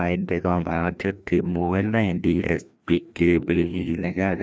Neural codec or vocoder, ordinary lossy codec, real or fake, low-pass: codec, 16 kHz, 1 kbps, FreqCodec, larger model; none; fake; none